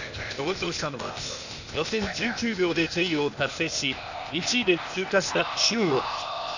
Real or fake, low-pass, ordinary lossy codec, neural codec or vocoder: fake; 7.2 kHz; none; codec, 16 kHz, 0.8 kbps, ZipCodec